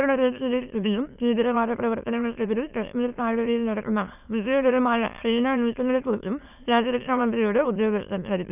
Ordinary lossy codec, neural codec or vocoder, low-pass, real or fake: none; autoencoder, 22.05 kHz, a latent of 192 numbers a frame, VITS, trained on many speakers; 3.6 kHz; fake